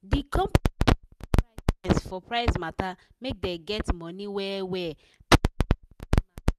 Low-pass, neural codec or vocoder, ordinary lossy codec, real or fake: 14.4 kHz; none; none; real